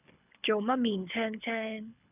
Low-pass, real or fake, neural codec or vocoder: 3.6 kHz; fake; codec, 24 kHz, 6 kbps, HILCodec